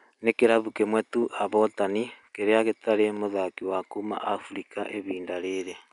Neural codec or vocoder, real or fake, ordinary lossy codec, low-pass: none; real; none; 10.8 kHz